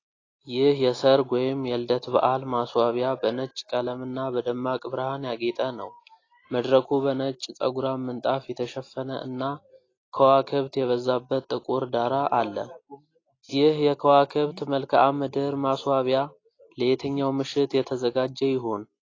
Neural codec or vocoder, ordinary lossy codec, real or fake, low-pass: none; AAC, 32 kbps; real; 7.2 kHz